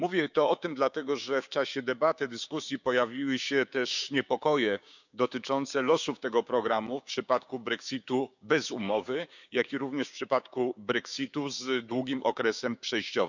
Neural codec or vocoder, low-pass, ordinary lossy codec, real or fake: codec, 16 kHz, 6 kbps, DAC; 7.2 kHz; none; fake